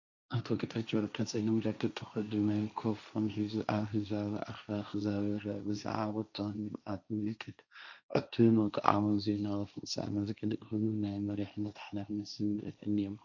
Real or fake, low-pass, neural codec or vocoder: fake; 7.2 kHz; codec, 16 kHz, 1.1 kbps, Voila-Tokenizer